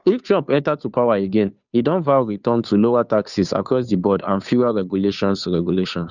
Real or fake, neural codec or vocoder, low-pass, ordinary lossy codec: fake; codec, 16 kHz, 2 kbps, FunCodec, trained on Chinese and English, 25 frames a second; 7.2 kHz; none